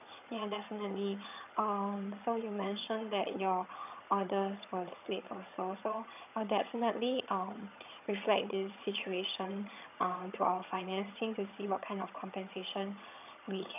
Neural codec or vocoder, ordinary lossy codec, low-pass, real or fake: vocoder, 22.05 kHz, 80 mel bands, HiFi-GAN; none; 3.6 kHz; fake